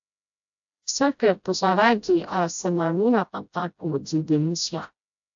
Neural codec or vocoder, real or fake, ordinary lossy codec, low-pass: codec, 16 kHz, 0.5 kbps, FreqCodec, smaller model; fake; AAC, 64 kbps; 7.2 kHz